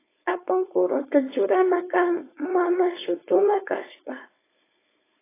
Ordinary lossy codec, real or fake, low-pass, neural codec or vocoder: AAC, 16 kbps; fake; 3.6 kHz; codec, 16 kHz, 4.8 kbps, FACodec